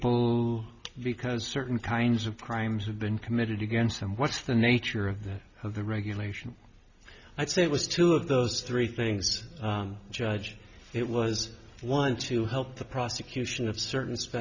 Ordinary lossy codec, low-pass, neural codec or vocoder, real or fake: Opus, 64 kbps; 7.2 kHz; none; real